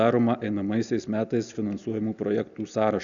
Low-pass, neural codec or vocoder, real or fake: 7.2 kHz; none; real